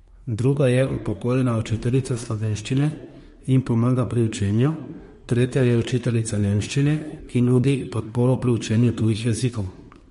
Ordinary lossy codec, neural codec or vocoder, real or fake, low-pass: MP3, 48 kbps; codec, 24 kHz, 1 kbps, SNAC; fake; 10.8 kHz